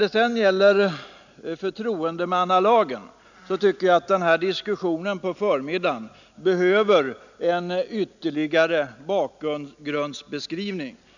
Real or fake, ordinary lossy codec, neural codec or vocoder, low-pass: real; none; none; 7.2 kHz